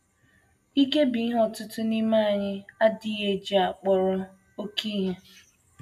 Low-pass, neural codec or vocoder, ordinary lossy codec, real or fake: 14.4 kHz; none; AAC, 96 kbps; real